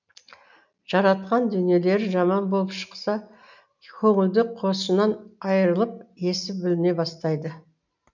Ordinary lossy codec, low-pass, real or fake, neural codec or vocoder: none; 7.2 kHz; real; none